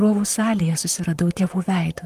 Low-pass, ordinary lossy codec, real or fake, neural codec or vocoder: 14.4 kHz; Opus, 24 kbps; fake; vocoder, 44.1 kHz, 128 mel bands, Pupu-Vocoder